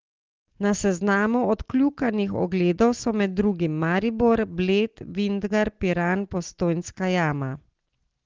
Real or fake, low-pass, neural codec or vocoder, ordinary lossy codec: real; 7.2 kHz; none; Opus, 16 kbps